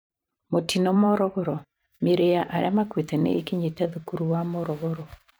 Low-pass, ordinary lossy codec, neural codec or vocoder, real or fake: none; none; vocoder, 44.1 kHz, 128 mel bands every 512 samples, BigVGAN v2; fake